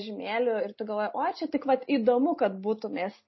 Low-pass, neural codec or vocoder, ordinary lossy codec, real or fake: 7.2 kHz; none; MP3, 24 kbps; real